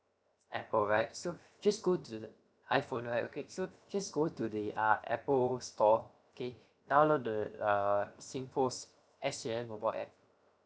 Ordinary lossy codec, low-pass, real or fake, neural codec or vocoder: none; none; fake; codec, 16 kHz, 0.7 kbps, FocalCodec